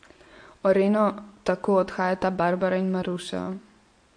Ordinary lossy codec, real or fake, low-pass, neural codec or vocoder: MP3, 48 kbps; fake; 9.9 kHz; vocoder, 24 kHz, 100 mel bands, Vocos